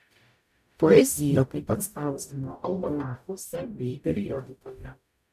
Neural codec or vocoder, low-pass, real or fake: codec, 44.1 kHz, 0.9 kbps, DAC; 14.4 kHz; fake